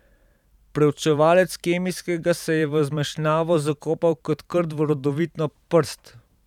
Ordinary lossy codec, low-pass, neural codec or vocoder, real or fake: none; 19.8 kHz; vocoder, 44.1 kHz, 128 mel bands every 512 samples, BigVGAN v2; fake